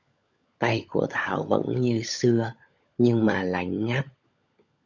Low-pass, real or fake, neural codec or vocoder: 7.2 kHz; fake; codec, 16 kHz, 16 kbps, FunCodec, trained on LibriTTS, 50 frames a second